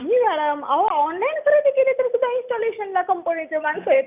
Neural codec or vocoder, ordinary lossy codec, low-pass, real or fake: none; none; 3.6 kHz; real